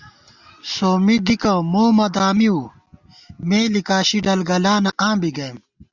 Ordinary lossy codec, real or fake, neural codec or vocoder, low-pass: Opus, 64 kbps; real; none; 7.2 kHz